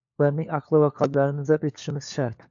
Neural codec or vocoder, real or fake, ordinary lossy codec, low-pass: codec, 16 kHz, 4 kbps, FunCodec, trained on LibriTTS, 50 frames a second; fake; AAC, 64 kbps; 7.2 kHz